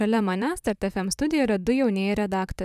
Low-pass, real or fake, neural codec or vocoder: 14.4 kHz; fake; vocoder, 44.1 kHz, 128 mel bands, Pupu-Vocoder